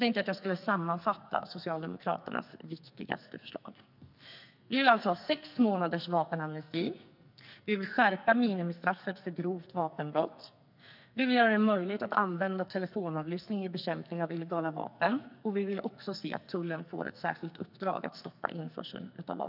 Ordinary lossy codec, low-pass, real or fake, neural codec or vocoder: none; 5.4 kHz; fake; codec, 44.1 kHz, 2.6 kbps, SNAC